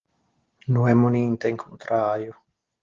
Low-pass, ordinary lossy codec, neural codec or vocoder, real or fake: 7.2 kHz; Opus, 16 kbps; none; real